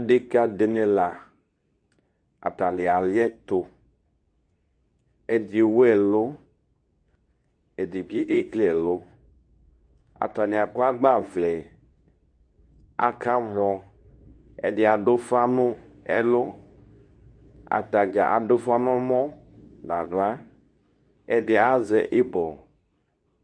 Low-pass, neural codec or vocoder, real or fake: 9.9 kHz; codec, 24 kHz, 0.9 kbps, WavTokenizer, medium speech release version 2; fake